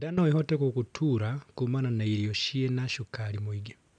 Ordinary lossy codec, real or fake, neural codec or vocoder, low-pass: none; real; none; 9.9 kHz